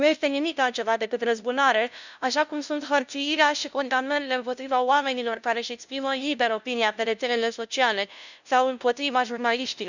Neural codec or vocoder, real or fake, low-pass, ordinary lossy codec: codec, 16 kHz, 0.5 kbps, FunCodec, trained on LibriTTS, 25 frames a second; fake; 7.2 kHz; none